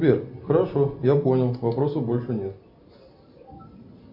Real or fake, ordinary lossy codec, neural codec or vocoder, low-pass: real; AAC, 48 kbps; none; 5.4 kHz